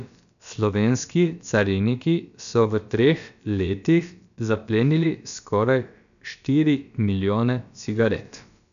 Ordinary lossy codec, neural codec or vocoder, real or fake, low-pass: none; codec, 16 kHz, about 1 kbps, DyCAST, with the encoder's durations; fake; 7.2 kHz